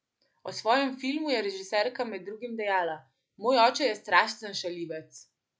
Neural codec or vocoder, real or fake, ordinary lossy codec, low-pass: none; real; none; none